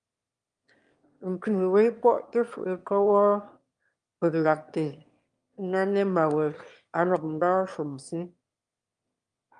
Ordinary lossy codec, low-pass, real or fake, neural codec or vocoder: Opus, 32 kbps; 9.9 kHz; fake; autoencoder, 22.05 kHz, a latent of 192 numbers a frame, VITS, trained on one speaker